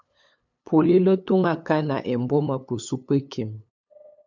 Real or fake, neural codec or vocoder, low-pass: fake; codec, 16 kHz, 8 kbps, FunCodec, trained on LibriTTS, 25 frames a second; 7.2 kHz